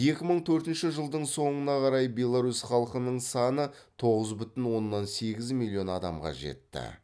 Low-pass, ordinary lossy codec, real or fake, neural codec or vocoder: none; none; real; none